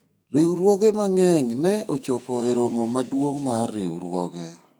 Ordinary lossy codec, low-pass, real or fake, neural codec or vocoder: none; none; fake; codec, 44.1 kHz, 2.6 kbps, SNAC